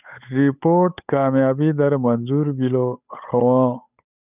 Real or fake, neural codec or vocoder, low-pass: fake; codec, 16 kHz, 8 kbps, FunCodec, trained on Chinese and English, 25 frames a second; 3.6 kHz